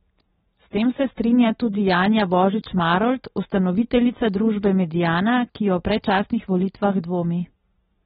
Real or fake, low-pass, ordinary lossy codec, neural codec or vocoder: real; 19.8 kHz; AAC, 16 kbps; none